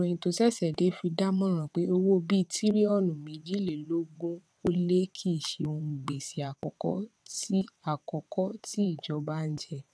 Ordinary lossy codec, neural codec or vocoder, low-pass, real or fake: none; vocoder, 22.05 kHz, 80 mel bands, WaveNeXt; none; fake